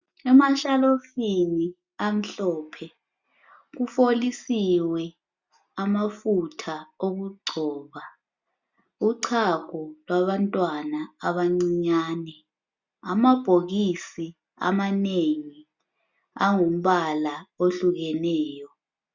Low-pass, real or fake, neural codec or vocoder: 7.2 kHz; real; none